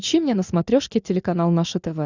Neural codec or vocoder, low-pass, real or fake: none; 7.2 kHz; real